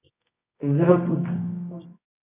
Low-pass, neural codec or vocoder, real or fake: 3.6 kHz; codec, 24 kHz, 0.9 kbps, WavTokenizer, medium music audio release; fake